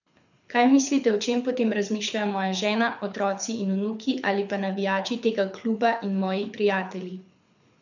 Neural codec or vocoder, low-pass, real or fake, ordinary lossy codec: codec, 24 kHz, 6 kbps, HILCodec; 7.2 kHz; fake; none